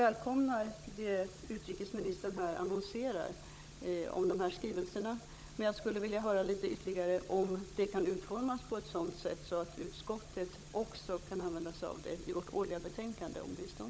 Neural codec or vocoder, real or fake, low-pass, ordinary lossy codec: codec, 16 kHz, 16 kbps, FunCodec, trained on LibriTTS, 50 frames a second; fake; none; none